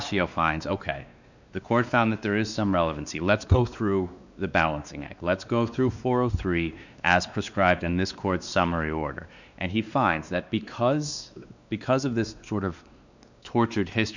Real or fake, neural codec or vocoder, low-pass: fake; codec, 16 kHz, 2 kbps, X-Codec, WavLM features, trained on Multilingual LibriSpeech; 7.2 kHz